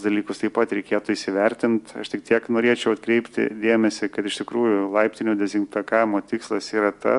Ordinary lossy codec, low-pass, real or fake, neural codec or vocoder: MP3, 64 kbps; 10.8 kHz; real; none